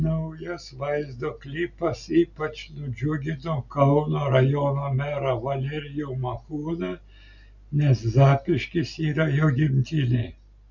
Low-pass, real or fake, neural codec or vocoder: 7.2 kHz; real; none